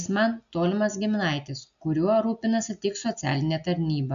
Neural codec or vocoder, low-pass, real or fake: none; 7.2 kHz; real